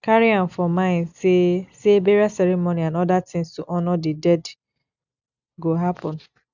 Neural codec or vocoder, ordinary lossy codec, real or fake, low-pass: none; none; real; 7.2 kHz